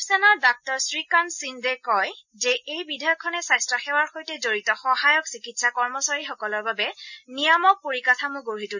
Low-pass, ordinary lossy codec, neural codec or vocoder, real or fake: 7.2 kHz; none; none; real